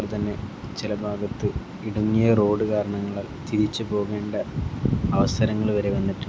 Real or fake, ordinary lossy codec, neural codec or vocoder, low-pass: real; none; none; none